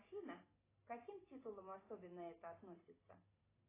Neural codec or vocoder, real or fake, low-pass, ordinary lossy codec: none; real; 3.6 kHz; AAC, 16 kbps